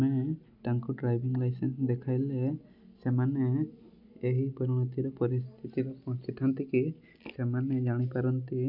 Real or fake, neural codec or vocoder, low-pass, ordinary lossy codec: real; none; 5.4 kHz; none